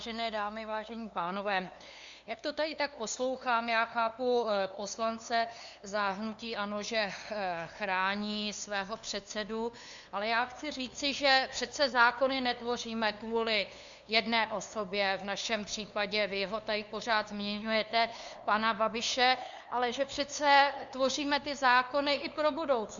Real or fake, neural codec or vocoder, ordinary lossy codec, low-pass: fake; codec, 16 kHz, 2 kbps, FunCodec, trained on LibriTTS, 25 frames a second; Opus, 64 kbps; 7.2 kHz